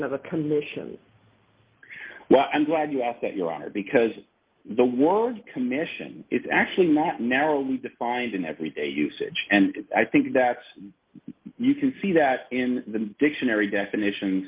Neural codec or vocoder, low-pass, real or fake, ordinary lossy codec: none; 3.6 kHz; real; Opus, 32 kbps